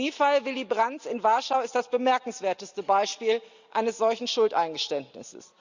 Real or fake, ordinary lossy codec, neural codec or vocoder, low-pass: real; Opus, 64 kbps; none; 7.2 kHz